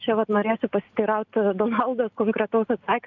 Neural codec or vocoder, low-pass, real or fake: none; 7.2 kHz; real